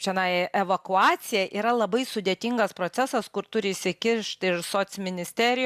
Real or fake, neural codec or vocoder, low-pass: real; none; 14.4 kHz